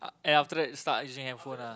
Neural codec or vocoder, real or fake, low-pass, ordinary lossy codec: none; real; none; none